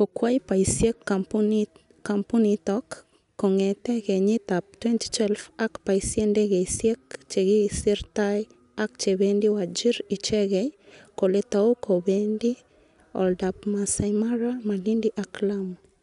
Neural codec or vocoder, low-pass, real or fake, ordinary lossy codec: none; 10.8 kHz; real; none